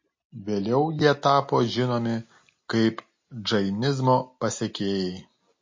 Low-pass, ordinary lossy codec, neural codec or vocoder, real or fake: 7.2 kHz; MP3, 32 kbps; none; real